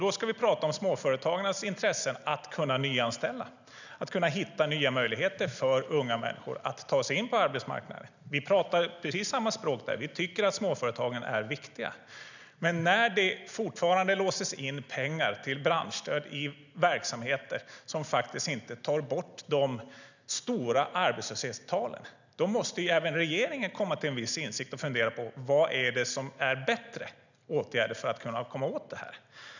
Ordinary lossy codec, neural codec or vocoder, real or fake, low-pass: none; none; real; 7.2 kHz